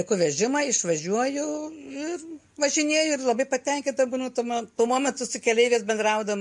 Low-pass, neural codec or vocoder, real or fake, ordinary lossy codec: 10.8 kHz; none; real; MP3, 48 kbps